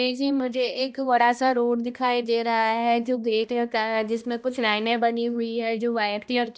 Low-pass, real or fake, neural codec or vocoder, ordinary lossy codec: none; fake; codec, 16 kHz, 1 kbps, X-Codec, HuBERT features, trained on balanced general audio; none